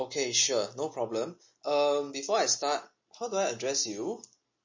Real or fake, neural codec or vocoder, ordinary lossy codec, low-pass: real; none; MP3, 32 kbps; 7.2 kHz